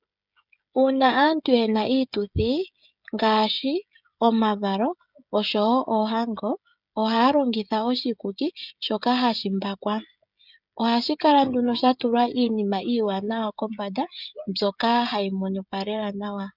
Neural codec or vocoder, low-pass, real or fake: codec, 16 kHz, 16 kbps, FreqCodec, smaller model; 5.4 kHz; fake